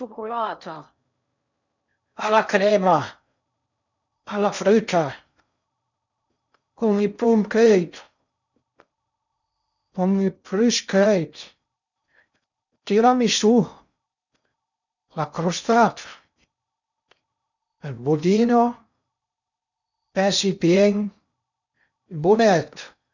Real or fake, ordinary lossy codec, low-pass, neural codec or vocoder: fake; none; 7.2 kHz; codec, 16 kHz in and 24 kHz out, 0.6 kbps, FocalCodec, streaming, 4096 codes